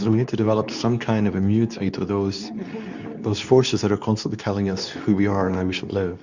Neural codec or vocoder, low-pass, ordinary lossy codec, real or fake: codec, 24 kHz, 0.9 kbps, WavTokenizer, medium speech release version 2; 7.2 kHz; Opus, 64 kbps; fake